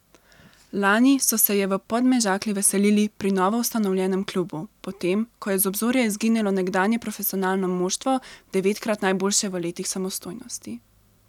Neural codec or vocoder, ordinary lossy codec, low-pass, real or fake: none; none; 19.8 kHz; real